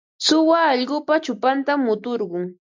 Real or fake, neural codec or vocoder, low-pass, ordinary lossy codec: real; none; 7.2 kHz; MP3, 64 kbps